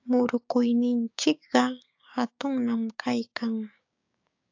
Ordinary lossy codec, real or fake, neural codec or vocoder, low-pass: AAC, 48 kbps; fake; codec, 16 kHz, 6 kbps, DAC; 7.2 kHz